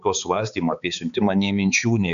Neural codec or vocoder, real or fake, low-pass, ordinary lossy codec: codec, 16 kHz, 4 kbps, X-Codec, HuBERT features, trained on balanced general audio; fake; 7.2 kHz; AAC, 96 kbps